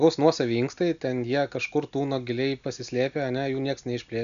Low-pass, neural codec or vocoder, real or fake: 7.2 kHz; none; real